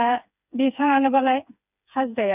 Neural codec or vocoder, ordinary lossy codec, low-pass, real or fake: codec, 16 kHz, 4 kbps, FreqCodec, smaller model; none; 3.6 kHz; fake